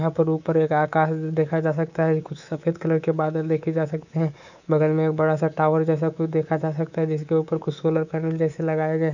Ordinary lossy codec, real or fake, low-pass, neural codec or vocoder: none; fake; 7.2 kHz; codec, 24 kHz, 3.1 kbps, DualCodec